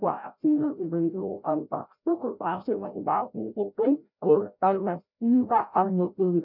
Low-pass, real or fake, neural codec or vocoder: 5.4 kHz; fake; codec, 16 kHz, 0.5 kbps, FreqCodec, larger model